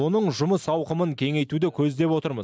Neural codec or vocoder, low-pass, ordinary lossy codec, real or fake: none; none; none; real